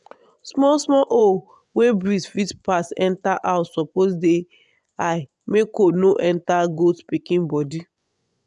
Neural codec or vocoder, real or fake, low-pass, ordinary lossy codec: vocoder, 24 kHz, 100 mel bands, Vocos; fake; 10.8 kHz; none